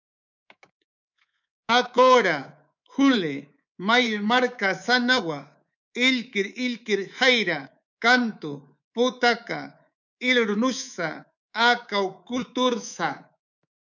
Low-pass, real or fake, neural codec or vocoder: 7.2 kHz; fake; codec, 24 kHz, 3.1 kbps, DualCodec